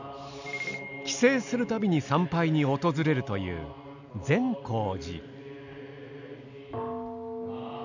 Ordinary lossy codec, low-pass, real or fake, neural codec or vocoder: none; 7.2 kHz; real; none